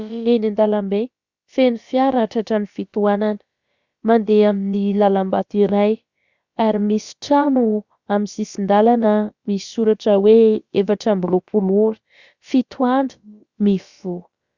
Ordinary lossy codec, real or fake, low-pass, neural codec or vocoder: Opus, 64 kbps; fake; 7.2 kHz; codec, 16 kHz, about 1 kbps, DyCAST, with the encoder's durations